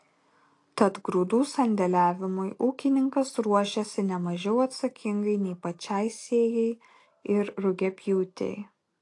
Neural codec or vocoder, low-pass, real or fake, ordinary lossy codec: none; 10.8 kHz; real; AAC, 48 kbps